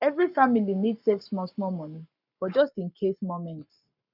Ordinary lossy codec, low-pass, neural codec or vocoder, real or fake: none; 5.4 kHz; codec, 44.1 kHz, 7.8 kbps, Pupu-Codec; fake